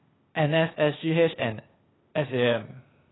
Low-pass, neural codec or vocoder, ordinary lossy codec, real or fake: 7.2 kHz; codec, 16 kHz, 0.8 kbps, ZipCodec; AAC, 16 kbps; fake